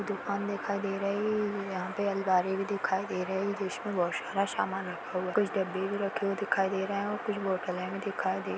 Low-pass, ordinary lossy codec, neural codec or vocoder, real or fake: none; none; none; real